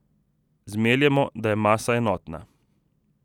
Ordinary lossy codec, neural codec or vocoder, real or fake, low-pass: none; none; real; 19.8 kHz